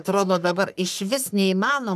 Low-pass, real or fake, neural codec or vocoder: 14.4 kHz; fake; codec, 44.1 kHz, 3.4 kbps, Pupu-Codec